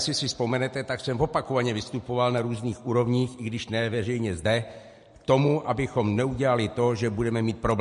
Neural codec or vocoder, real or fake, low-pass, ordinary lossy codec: none; real; 10.8 kHz; MP3, 48 kbps